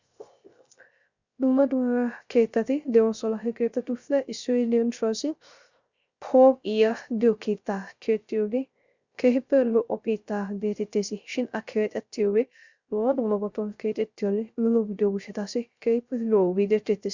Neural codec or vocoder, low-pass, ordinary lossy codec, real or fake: codec, 16 kHz, 0.3 kbps, FocalCodec; 7.2 kHz; Opus, 64 kbps; fake